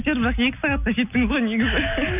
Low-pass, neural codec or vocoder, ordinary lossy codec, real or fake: 3.6 kHz; none; none; real